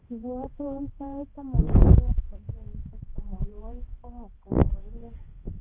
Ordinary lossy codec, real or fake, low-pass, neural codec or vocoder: Opus, 16 kbps; fake; 3.6 kHz; codec, 16 kHz, 2 kbps, X-Codec, HuBERT features, trained on balanced general audio